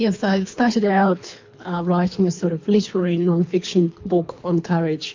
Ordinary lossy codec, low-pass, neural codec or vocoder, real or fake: MP3, 48 kbps; 7.2 kHz; codec, 24 kHz, 3 kbps, HILCodec; fake